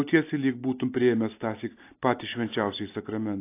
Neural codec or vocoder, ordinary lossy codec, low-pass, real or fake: none; AAC, 24 kbps; 3.6 kHz; real